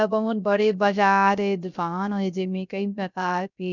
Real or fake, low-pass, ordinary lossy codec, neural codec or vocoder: fake; 7.2 kHz; none; codec, 16 kHz, 0.3 kbps, FocalCodec